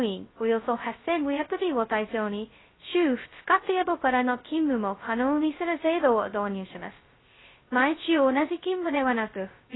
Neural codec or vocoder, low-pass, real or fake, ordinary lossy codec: codec, 16 kHz, 0.2 kbps, FocalCodec; 7.2 kHz; fake; AAC, 16 kbps